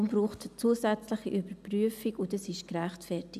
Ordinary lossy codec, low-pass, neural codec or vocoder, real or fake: none; 14.4 kHz; none; real